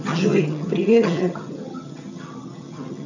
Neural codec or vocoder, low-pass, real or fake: vocoder, 22.05 kHz, 80 mel bands, HiFi-GAN; 7.2 kHz; fake